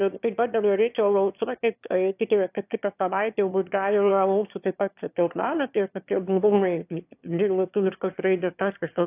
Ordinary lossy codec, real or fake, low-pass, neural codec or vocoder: AAC, 32 kbps; fake; 3.6 kHz; autoencoder, 22.05 kHz, a latent of 192 numbers a frame, VITS, trained on one speaker